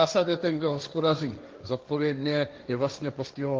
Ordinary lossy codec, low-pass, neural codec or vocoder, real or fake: Opus, 24 kbps; 7.2 kHz; codec, 16 kHz, 1.1 kbps, Voila-Tokenizer; fake